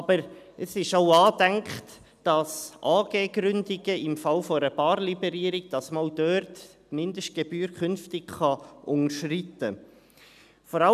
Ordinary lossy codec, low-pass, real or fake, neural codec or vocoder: none; 14.4 kHz; real; none